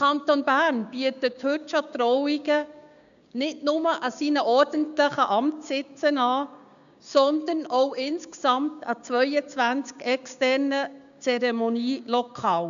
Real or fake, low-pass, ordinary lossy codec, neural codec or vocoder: fake; 7.2 kHz; none; codec, 16 kHz, 6 kbps, DAC